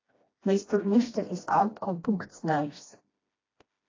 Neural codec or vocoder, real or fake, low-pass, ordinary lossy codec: codec, 16 kHz, 1 kbps, FreqCodec, smaller model; fake; 7.2 kHz; AAC, 32 kbps